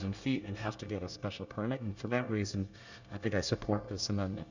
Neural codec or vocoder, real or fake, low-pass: codec, 24 kHz, 1 kbps, SNAC; fake; 7.2 kHz